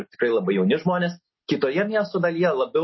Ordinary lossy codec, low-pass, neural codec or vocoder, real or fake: MP3, 24 kbps; 7.2 kHz; none; real